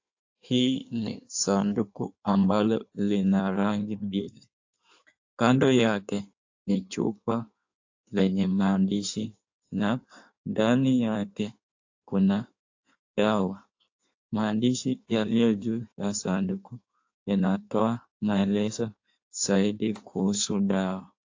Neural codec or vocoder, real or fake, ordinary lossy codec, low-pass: codec, 16 kHz in and 24 kHz out, 1.1 kbps, FireRedTTS-2 codec; fake; AAC, 48 kbps; 7.2 kHz